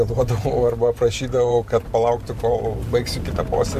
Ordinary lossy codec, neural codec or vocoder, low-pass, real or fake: MP3, 64 kbps; vocoder, 44.1 kHz, 128 mel bands every 512 samples, BigVGAN v2; 14.4 kHz; fake